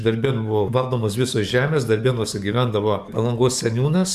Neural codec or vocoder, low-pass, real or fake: codec, 44.1 kHz, 7.8 kbps, DAC; 14.4 kHz; fake